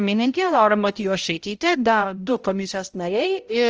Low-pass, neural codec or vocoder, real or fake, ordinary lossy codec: 7.2 kHz; codec, 16 kHz, 0.5 kbps, X-Codec, HuBERT features, trained on balanced general audio; fake; Opus, 24 kbps